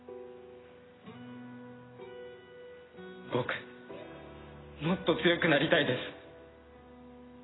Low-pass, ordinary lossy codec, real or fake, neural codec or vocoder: 7.2 kHz; AAC, 16 kbps; real; none